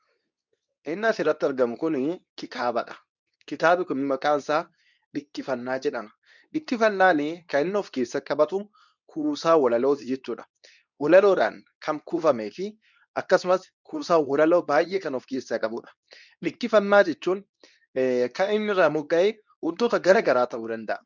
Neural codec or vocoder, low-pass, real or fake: codec, 24 kHz, 0.9 kbps, WavTokenizer, medium speech release version 2; 7.2 kHz; fake